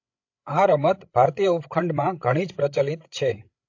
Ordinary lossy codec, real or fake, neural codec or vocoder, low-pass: AAC, 48 kbps; fake; codec, 16 kHz, 16 kbps, FreqCodec, larger model; 7.2 kHz